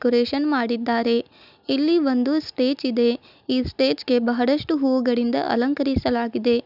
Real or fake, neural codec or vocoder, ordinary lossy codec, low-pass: real; none; none; 5.4 kHz